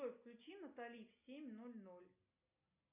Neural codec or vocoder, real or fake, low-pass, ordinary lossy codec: none; real; 3.6 kHz; MP3, 32 kbps